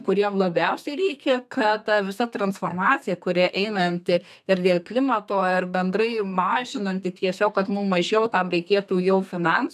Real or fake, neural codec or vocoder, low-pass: fake; codec, 32 kHz, 1.9 kbps, SNAC; 14.4 kHz